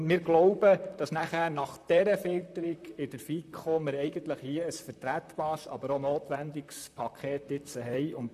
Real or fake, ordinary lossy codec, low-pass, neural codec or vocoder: fake; none; 14.4 kHz; vocoder, 44.1 kHz, 128 mel bands, Pupu-Vocoder